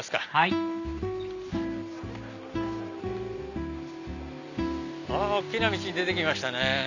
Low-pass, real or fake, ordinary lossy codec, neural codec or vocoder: 7.2 kHz; real; none; none